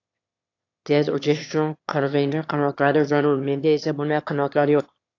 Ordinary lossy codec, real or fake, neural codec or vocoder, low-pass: AAC, 48 kbps; fake; autoencoder, 22.05 kHz, a latent of 192 numbers a frame, VITS, trained on one speaker; 7.2 kHz